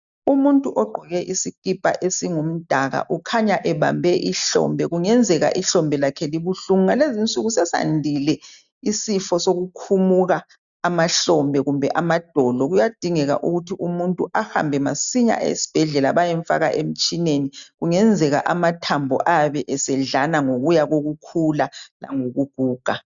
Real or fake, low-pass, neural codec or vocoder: real; 7.2 kHz; none